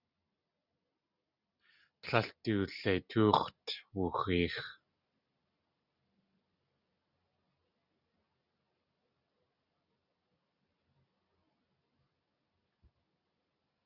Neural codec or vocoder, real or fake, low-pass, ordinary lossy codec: none; real; 5.4 kHz; Opus, 64 kbps